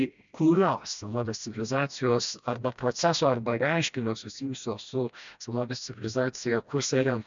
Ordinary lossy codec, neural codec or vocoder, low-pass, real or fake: MP3, 64 kbps; codec, 16 kHz, 1 kbps, FreqCodec, smaller model; 7.2 kHz; fake